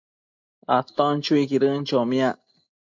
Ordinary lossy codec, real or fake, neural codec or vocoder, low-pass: MP3, 48 kbps; real; none; 7.2 kHz